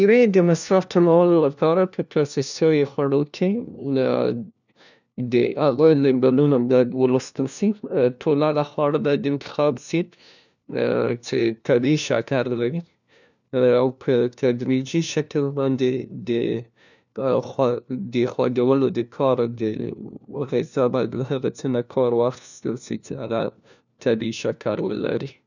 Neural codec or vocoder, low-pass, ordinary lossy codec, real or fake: codec, 16 kHz, 1 kbps, FunCodec, trained on LibriTTS, 50 frames a second; 7.2 kHz; none; fake